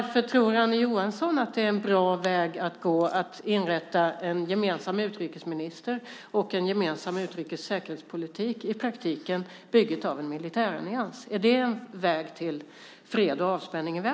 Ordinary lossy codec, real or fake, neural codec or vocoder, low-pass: none; real; none; none